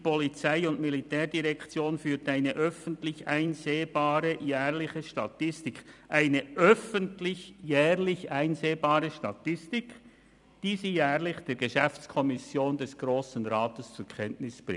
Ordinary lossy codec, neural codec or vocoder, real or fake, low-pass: none; vocoder, 44.1 kHz, 128 mel bands every 256 samples, BigVGAN v2; fake; 10.8 kHz